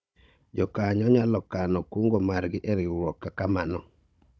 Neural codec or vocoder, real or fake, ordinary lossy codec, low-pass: codec, 16 kHz, 16 kbps, FunCodec, trained on Chinese and English, 50 frames a second; fake; none; none